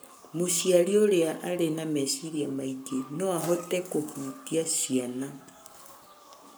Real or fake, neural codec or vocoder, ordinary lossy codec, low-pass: fake; codec, 44.1 kHz, 7.8 kbps, Pupu-Codec; none; none